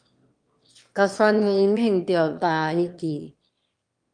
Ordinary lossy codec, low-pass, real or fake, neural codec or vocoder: Opus, 32 kbps; 9.9 kHz; fake; autoencoder, 22.05 kHz, a latent of 192 numbers a frame, VITS, trained on one speaker